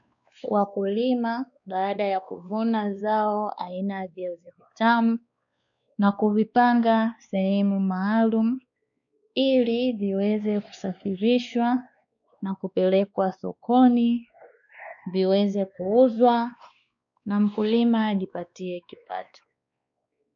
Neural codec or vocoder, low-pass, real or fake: codec, 16 kHz, 2 kbps, X-Codec, WavLM features, trained on Multilingual LibriSpeech; 7.2 kHz; fake